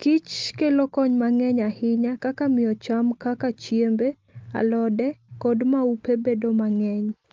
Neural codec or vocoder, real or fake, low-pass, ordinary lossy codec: none; real; 7.2 kHz; Opus, 32 kbps